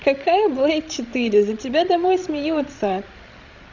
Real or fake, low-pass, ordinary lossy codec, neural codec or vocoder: fake; 7.2 kHz; none; codec, 16 kHz, 16 kbps, FreqCodec, larger model